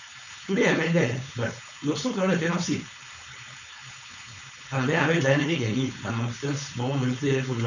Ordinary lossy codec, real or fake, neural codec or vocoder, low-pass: none; fake; codec, 16 kHz, 4.8 kbps, FACodec; 7.2 kHz